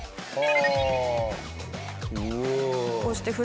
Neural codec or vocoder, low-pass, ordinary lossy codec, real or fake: none; none; none; real